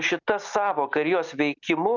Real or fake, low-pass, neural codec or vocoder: real; 7.2 kHz; none